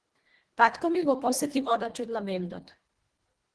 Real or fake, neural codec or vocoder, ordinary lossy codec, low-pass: fake; codec, 24 kHz, 1.5 kbps, HILCodec; Opus, 16 kbps; 10.8 kHz